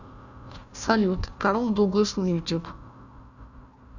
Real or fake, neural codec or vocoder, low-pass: fake; codec, 16 kHz, 1 kbps, FunCodec, trained on Chinese and English, 50 frames a second; 7.2 kHz